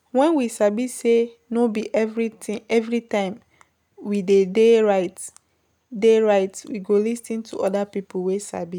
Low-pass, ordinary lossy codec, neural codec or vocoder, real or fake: 19.8 kHz; none; none; real